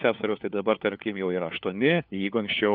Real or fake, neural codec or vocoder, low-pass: fake; codec, 16 kHz, 4 kbps, FunCodec, trained on LibriTTS, 50 frames a second; 5.4 kHz